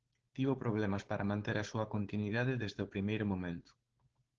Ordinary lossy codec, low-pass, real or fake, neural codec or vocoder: Opus, 16 kbps; 7.2 kHz; fake; codec, 16 kHz, 4.8 kbps, FACodec